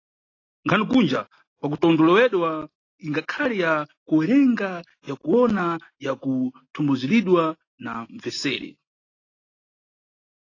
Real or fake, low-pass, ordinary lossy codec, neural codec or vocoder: real; 7.2 kHz; AAC, 32 kbps; none